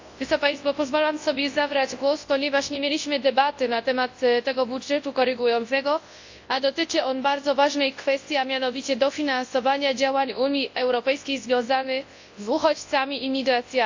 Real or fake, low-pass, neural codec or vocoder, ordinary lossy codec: fake; 7.2 kHz; codec, 24 kHz, 0.9 kbps, WavTokenizer, large speech release; AAC, 48 kbps